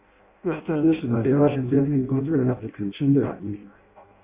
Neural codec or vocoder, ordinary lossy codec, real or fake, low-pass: codec, 16 kHz in and 24 kHz out, 0.6 kbps, FireRedTTS-2 codec; Opus, 64 kbps; fake; 3.6 kHz